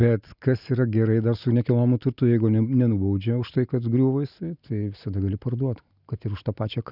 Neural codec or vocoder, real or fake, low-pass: none; real; 5.4 kHz